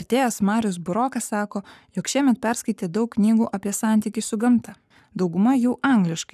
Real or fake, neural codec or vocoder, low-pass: fake; codec, 44.1 kHz, 7.8 kbps, Pupu-Codec; 14.4 kHz